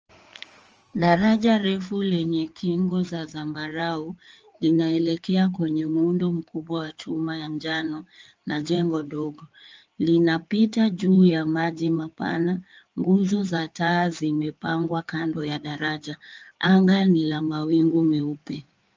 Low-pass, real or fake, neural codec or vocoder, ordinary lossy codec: 7.2 kHz; fake; codec, 16 kHz in and 24 kHz out, 2.2 kbps, FireRedTTS-2 codec; Opus, 24 kbps